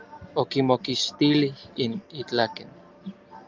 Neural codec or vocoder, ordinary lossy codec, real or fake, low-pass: none; Opus, 32 kbps; real; 7.2 kHz